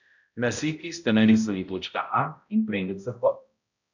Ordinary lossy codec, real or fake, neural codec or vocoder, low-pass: none; fake; codec, 16 kHz, 0.5 kbps, X-Codec, HuBERT features, trained on balanced general audio; 7.2 kHz